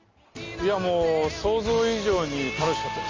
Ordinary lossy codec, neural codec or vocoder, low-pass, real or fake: Opus, 32 kbps; none; 7.2 kHz; real